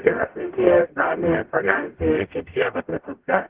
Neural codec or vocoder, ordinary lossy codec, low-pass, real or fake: codec, 44.1 kHz, 0.9 kbps, DAC; Opus, 16 kbps; 3.6 kHz; fake